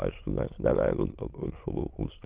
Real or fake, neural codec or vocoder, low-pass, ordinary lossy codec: fake; autoencoder, 22.05 kHz, a latent of 192 numbers a frame, VITS, trained on many speakers; 3.6 kHz; Opus, 16 kbps